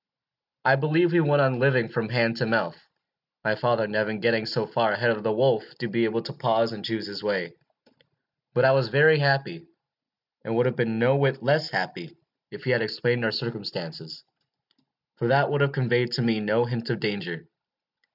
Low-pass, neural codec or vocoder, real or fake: 5.4 kHz; none; real